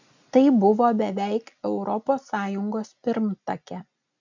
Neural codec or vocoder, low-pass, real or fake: none; 7.2 kHz; real